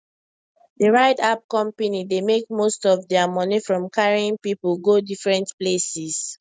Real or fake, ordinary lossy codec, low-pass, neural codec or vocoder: real; none; none; none